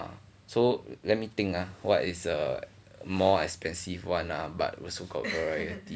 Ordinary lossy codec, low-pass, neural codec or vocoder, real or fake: none; none; none; real